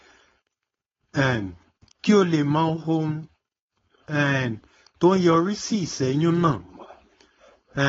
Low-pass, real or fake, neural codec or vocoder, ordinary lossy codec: 7.2 kHz; fake; codec, 16 kHz, 4.8 kbps, FACodec; AAC, 24 kbps